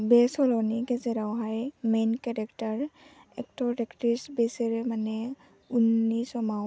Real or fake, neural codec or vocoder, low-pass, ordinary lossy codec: real; none; none; none